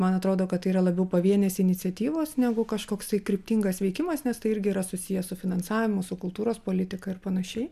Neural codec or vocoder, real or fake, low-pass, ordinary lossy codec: none; real; 14.4 kHz; MP3, 96 kbps